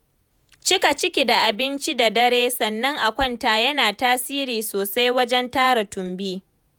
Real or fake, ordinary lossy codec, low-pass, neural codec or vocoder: fake; none; none; vocoder, 48 kHz, 128 mel bands, Vocos